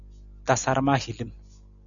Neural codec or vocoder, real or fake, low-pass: none; real; 7.2 kHz